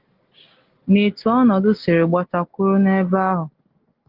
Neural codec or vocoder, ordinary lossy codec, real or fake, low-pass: none; Opus, 16 kbps; real; 5.4 kHz